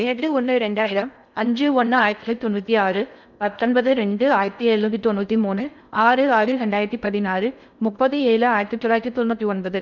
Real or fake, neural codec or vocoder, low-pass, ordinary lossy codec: fake; codec, 16 kHz in and 24 kHz out, 0.6 kbps, FocalCodec, streaming, 4096 codes; 7.2 kHz; none